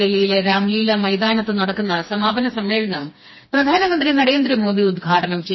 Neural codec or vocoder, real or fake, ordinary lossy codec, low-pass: codec, 16 kHz, 2 kbps, FreqCodec, smaller model; fake; MP3, 24 kbps; 7.2 kHz